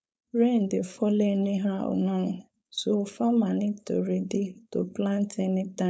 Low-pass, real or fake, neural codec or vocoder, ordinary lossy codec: none; fake; codec, 16 kHz, 4.8 kbps, FACodec; none